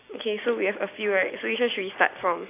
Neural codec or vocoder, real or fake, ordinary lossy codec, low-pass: none; real; AAC, 24 kbps; 3.6 kHz